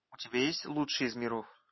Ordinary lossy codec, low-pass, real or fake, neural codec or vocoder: MP3, 24 kbps; 7.2 kHz; real; none